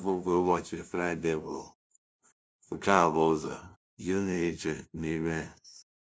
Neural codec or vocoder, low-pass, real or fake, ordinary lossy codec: codec, 16 kHz, 0.5 kbps, FunCodec, trained on LibriTTS, 25 frames a second; none; fake; none